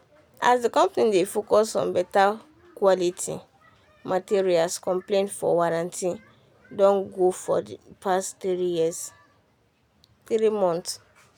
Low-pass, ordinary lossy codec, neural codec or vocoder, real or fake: none; none; none; real